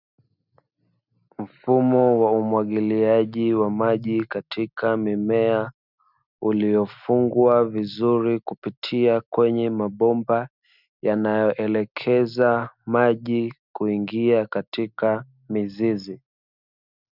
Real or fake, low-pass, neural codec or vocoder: real; 5.4 kHz; none